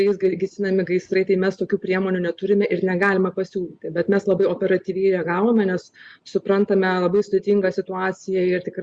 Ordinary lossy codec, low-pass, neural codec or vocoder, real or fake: Opus, 64 kbps; 9.9 kHz; none; real